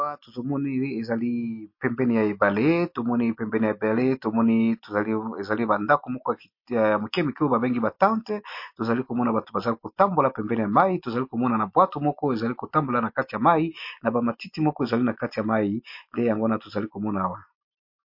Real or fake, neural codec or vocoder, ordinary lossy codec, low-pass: real; none; MP3, 32 kbps; 5.4 kHz